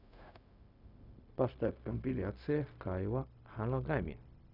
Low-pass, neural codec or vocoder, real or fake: 5.4 kHz; codec, 16 kHz, 0.4 kbps, LongCat-Audio-Codec; fake